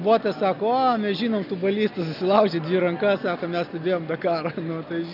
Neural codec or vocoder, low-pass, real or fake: none; 5.4 kHz; real